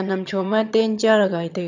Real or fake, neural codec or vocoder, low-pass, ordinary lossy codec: fake; vocoder, 22.05 kHz, 80 mel bands, HiFi-GAN; 7.2 kHz; none